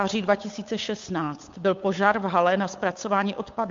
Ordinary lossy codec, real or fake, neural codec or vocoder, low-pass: MP3, 48 kbps; fake; codec, 16 kHz, 8 kbps, FunCodec, trained on Chinese and English, 25 frames a second; 7.2 kHz